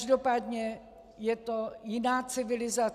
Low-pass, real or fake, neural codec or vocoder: 14.4 kHz; real; none